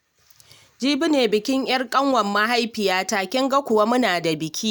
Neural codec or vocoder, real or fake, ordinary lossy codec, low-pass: none; real; none; none